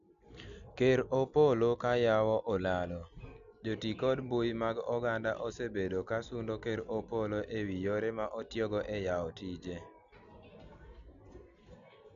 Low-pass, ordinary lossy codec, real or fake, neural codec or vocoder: 7.2 kHz; MP3, 96 kbps; real; none